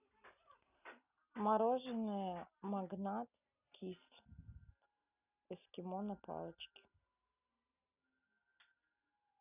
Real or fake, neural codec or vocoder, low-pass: real; none; 3.6 kHz